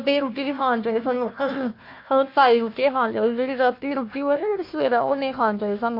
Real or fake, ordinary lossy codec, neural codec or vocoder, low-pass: fake; MP3, 32 kbps; codec, 16 kHz, 1 kbps, FunCodec, trained on Chinese and English, 50 frames a second; 5.4 kHz